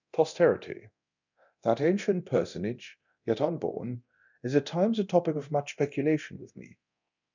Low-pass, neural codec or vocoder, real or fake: 7.2 kHz; codec, 24 kHz, 0.9 kbps, DualCodec; fake